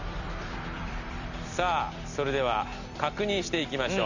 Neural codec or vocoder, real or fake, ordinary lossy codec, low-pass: vocoder, 44.1 kHz, 128 mel bands every 256 samples, BigVGAN v2; fake; none; 7.2 kHz